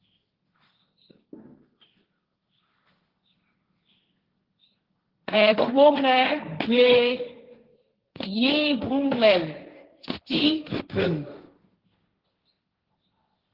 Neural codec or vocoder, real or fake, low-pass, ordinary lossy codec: codec, 16 kHz, 1.1 kbps, Voila-Tokenizer; fake; 5.4 kHz; Opus, 16 kbps